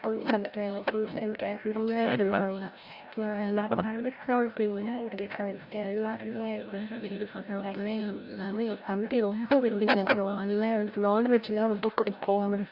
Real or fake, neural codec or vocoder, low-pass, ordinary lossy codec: fake; codec, 16 kHz, 0.5 kbps, FreqCodec, larger model; 5.4 kHz; Opus, 64 kbps